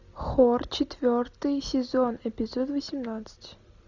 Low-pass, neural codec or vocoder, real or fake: 7.2 kHz; none; real